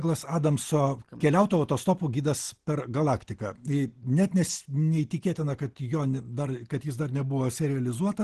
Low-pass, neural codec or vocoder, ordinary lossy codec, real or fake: 10.8 kHz; none; Opus, 16 kbps; real